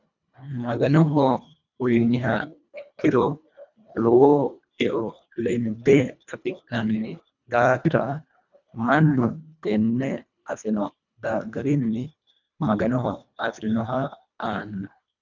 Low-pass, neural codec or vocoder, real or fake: 7.2 kHz; codec, 24 kHz, 1.5 kbps, HILCodec; fake